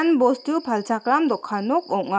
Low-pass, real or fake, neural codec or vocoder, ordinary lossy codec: none; real; none; none